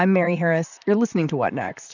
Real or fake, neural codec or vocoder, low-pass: fake; vocoder, 44.1 kHz, 128 mel bands, Pupu-Vocoder; 7.2 kHz